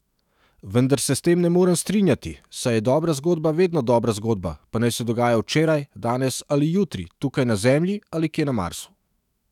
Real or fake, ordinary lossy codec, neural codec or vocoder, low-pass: fake; none; autoencoder, 48 kHz, 128 numbers a frame, DAC-VAE, trained on Japanese speech; 19.8 kHz